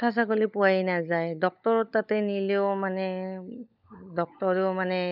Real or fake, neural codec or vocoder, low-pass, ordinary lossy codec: fake; codec, 16 kHz, 16 kbps, FunCodec, trained on LibriTTS, 50 frames a second; 5.4 kHz; none